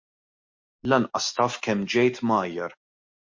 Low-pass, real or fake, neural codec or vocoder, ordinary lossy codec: 7.2 kHz; real; none; MP3, 48 kbps